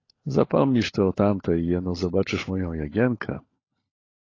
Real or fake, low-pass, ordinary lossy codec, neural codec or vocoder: fake; 7.2 kHz; AAC, 32 kbps; codec, 16 kHz, 16 kbps, FunCodec, trained on LibriTTS, 50 frames a second